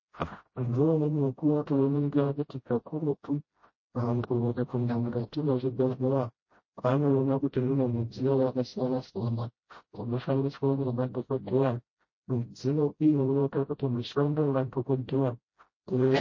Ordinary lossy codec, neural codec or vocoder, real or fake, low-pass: MP3, 32 kbps; codec, 16 kHz, 0.5 kbps, FreqCodec, smaller model; fake; 7.2 kHz